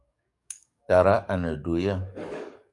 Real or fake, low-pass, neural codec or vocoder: fake; 10.8 kHz; codec, 44.1 kHz, 7.8 kbps, DAC